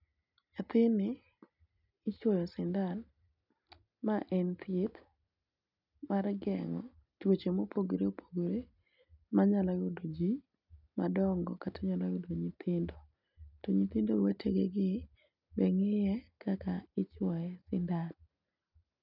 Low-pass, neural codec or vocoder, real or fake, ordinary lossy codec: 5.4 kHz; none; real; none